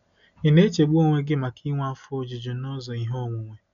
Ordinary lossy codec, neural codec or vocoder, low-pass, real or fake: none; none; 7.2 kHz; real